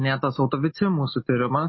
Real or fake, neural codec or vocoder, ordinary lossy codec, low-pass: real; none; MP3, 24 kbps; 7.2 kHz